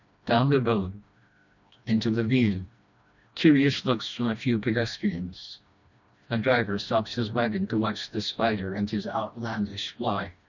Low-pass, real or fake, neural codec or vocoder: 7.2 kHz; fake; codec, 16 kHz, 1 kbps, FreqCodec, smaller model